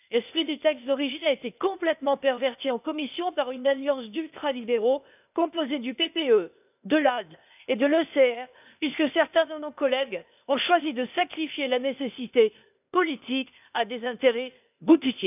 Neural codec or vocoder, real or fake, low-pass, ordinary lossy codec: codec, 16 kHz, 0.8 kbps, ZipCodec; fake; 3.6 kHz; none